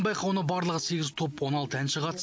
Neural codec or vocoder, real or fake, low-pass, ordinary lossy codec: none; real; none; none